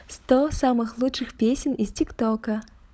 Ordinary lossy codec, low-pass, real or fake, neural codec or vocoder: none; none; fake; codec, 16 kHz, 16 kbps, FunCodec, trained on LibriTTS, 50 frames a second